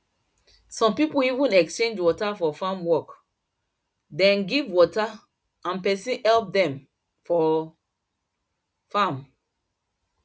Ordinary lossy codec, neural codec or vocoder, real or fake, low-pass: none; none; real; none